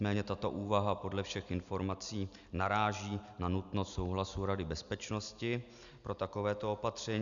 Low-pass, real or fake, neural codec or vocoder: 7.2 kHz; real; none